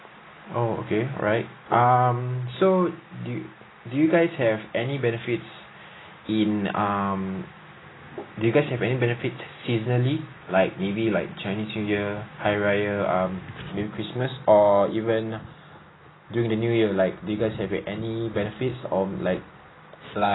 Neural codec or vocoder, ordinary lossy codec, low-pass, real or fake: none; AAC, 16 kbps; 7.2 kHz; real